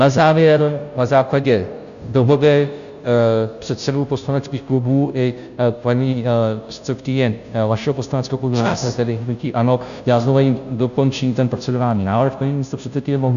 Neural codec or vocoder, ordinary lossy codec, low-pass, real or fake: codec, 16 kHz, 0.5 kbps, FunCodec, trained on Chinese and English, 25 frames a second; AAC, 96 kbps; 7.2 kHz; fake